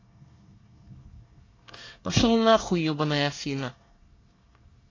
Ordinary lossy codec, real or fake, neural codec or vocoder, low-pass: AAC, 32 kbps; fake; codec, 24 kHz, 1 kbps, SNAC; 7.2 kHz